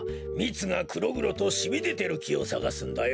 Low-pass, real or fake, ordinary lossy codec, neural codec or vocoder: none; real; none; none